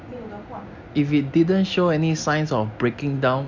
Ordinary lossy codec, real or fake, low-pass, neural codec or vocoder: none; real; 7.2 kHz; none